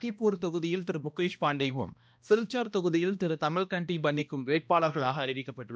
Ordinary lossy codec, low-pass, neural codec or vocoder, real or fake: none; none; codec, 16 kHz, 1 kbps, X-Codec, HuBERT features, trained on balanced general audio; fake